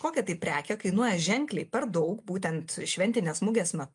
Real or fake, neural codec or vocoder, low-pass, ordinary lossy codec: real; none; 10.8 kHz; MP3, 64 kbps